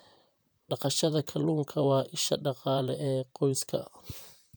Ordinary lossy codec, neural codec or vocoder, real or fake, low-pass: none; vocoder, 44.1 kHz, 128 mel bands, Pupu-Vocoder; fake; none